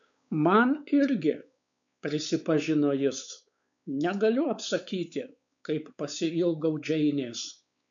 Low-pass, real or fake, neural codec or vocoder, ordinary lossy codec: 7.2 kHz; fake; codec, 16 kHz, 4 kbps, X-Codec, WavLM features, trained on Multilingual LibriSpeech; MP3, 64 kbps